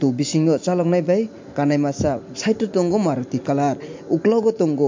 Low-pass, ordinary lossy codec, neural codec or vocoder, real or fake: 7.2 kHz; MP3, 48 kbps; none; real